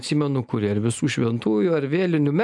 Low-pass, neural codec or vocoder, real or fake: 10.8 kHz; none; real